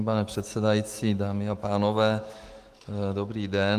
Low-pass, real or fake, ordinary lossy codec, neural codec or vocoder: 14.4 kHz; fake; Opus, 24 kbps; autoencoder, 48 kHz, 128 numbers a frame, DAC-VAE, trained on Japanese speech